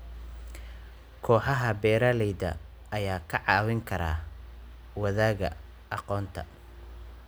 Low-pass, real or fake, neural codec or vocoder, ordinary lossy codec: none; real; none; none